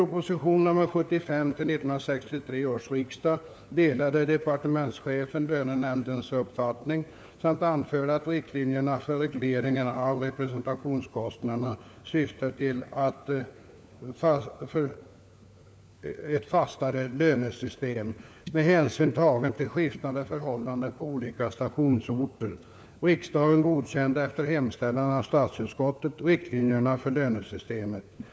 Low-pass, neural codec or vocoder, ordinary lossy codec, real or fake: none; codec, 16 kHz, 4 kbps, FunCodec, trained on LibriTTS, 50 frames a second; none; fake